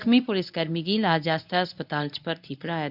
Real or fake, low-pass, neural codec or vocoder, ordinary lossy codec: fake; 5.4 kHz; codec, 24 kHz, 0.9 kbps, WavTokenizer, medium speech release version 1; none